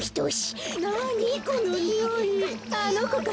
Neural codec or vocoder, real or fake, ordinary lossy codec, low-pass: none; real; none; none